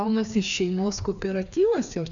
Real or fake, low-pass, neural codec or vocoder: fake; 7.2 kHz; codec, 16 kHz, 2 kbps, FreqCodec, larger model